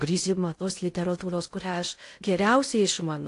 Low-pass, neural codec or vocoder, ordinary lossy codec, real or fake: 10.8 kHz; codec, 16 kHz in and 24 kHz out, 0.6 kbps, FocalCodec, streaming, 2048 codes; AAC, 48 kbps; fake